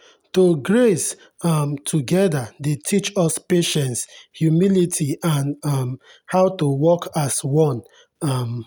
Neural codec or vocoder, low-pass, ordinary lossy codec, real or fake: none; none; none; real